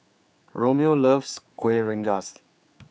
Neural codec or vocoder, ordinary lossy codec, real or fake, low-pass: codec, 16 kHz, 4 kbps, X-Codec, HuBERT features, trained on general audio; none; fake; none